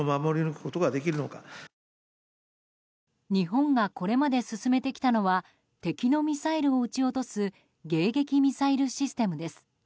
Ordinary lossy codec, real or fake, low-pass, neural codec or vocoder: none; real; none; none